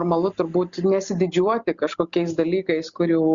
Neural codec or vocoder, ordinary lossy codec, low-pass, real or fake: none; Opus, 64 kbps; 7.2 kHz; real